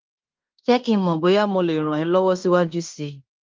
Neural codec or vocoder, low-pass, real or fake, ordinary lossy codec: codec, 16 kHz in and 24 kHz out, 0.9 kbps, LongCat-Audio-Codec, fine tuned four codebook decoder; 7.2 kHz; fake; Opus, 24 kbps